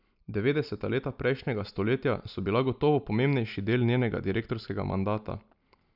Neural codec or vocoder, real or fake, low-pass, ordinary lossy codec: none; real; 5.4 kHz; none